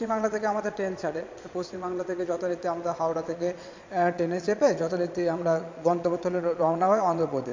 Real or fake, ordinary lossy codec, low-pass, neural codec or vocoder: fake; MP3, 48 kbps; 7.2 kHz; vocoder, 22.05 kHz, 80 mel bands, Vocos